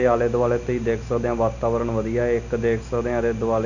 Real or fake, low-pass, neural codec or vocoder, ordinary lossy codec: real; 7.2 kHz; none; none